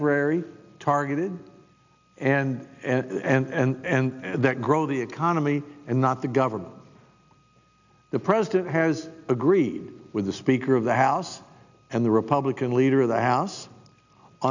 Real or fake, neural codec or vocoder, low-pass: real; none; 7.2 kHz